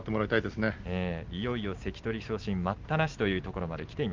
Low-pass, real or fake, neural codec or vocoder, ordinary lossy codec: 7.2 kHz; real; none; Opus, 32 kbps